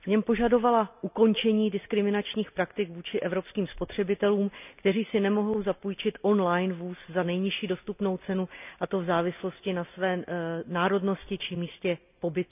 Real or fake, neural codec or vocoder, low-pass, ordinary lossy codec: real; none; 3.6 kHz; none